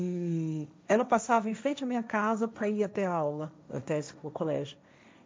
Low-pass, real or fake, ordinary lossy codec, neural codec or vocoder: none; fake; none; codec, 16 kHz, 1.1 kbps, Voila-Tokenizer